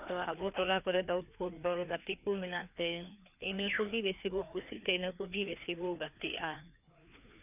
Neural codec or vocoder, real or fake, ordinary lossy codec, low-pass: codec, 16 kHz, 2 kbps, FreqCodec, larger model; fake; none; 3.6 kHz